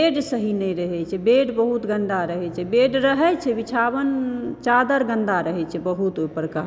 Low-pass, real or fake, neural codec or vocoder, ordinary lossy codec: none; real; none; none